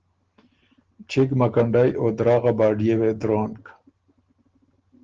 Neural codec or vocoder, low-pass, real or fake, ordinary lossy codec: none; 7.2 kHz; real; Opus, 16 kbps